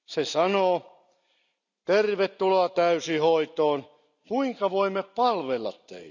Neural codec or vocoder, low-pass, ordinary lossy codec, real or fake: none; 7.2 kHz; none; real